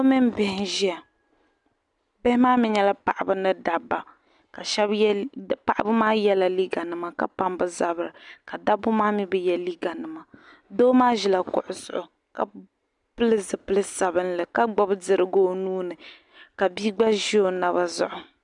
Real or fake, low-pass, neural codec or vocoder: real; 10.8 kHz; none